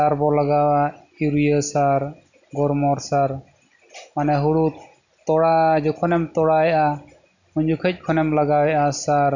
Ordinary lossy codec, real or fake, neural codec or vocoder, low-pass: none; real; none; 7.2 kHz